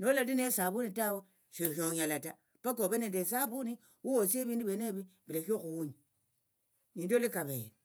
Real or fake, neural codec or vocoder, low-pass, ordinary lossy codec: fake; vocoder, 48 kHz, 128 mel bands, Vocos; none; none